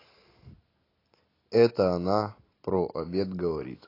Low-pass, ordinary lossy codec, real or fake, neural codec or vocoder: 5.4 kHz; AAC, 24 kbps; real; none